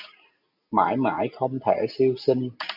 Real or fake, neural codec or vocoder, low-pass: real; none; 5.4 kHz